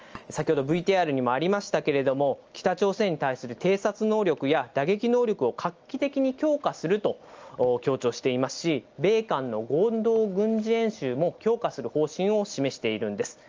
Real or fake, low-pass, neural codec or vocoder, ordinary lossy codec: real; 7.2 kHz; none; Opus, 24 kbps